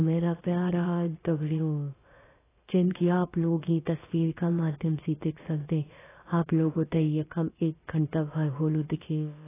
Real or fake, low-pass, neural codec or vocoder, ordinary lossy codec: fake; 3.6 kHz; codec, 16 kHz, about 1 kbps, DyCAST, with the encoder's durations; AAC, 16 kbps